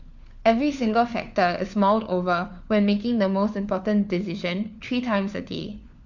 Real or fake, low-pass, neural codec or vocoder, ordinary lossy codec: fake; 7.2 kHz; codec, 16 kHz, 4 kbps, FunCodec, trained on LibriTTS, 50 frames a second; none